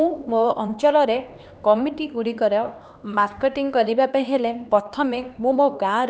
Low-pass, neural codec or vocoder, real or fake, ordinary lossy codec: none; codec, 16 kHz, 2 kbps, X-Codec, HuBERT features, trained on LibriSpeech; fake; none